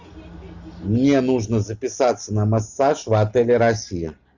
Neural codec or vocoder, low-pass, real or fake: none; 7.2 kHz; real